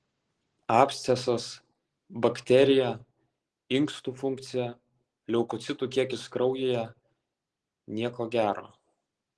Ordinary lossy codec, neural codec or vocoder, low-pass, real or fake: Opus, 16 kbps; none; 10.8 kHz; real